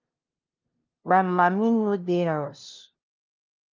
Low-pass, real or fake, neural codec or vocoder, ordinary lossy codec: 7.2 kHz; fake; codec, 16 kHz, 0.5 kbps, FunCodec, trained on LibriTTS, 25 frames a second; Opus, 32 kbps